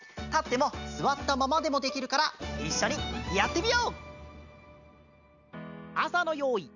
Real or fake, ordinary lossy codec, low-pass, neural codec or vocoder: real; none; 7.2 kHz; none